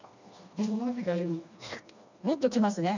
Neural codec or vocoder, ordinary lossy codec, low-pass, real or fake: codec, 16 kHz, 2 kbps, FreqCodec, smaller model; none; 7.2 kHz; fake